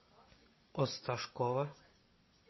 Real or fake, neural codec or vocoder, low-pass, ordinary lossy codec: real; none; 7.2 kHz; MP3, 24 kbps